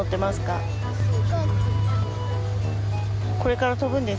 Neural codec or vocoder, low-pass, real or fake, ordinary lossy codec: none; none; real; none